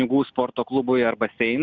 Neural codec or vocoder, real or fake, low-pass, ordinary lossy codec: none; real; 7.2 kHz; Opus, 64 kbps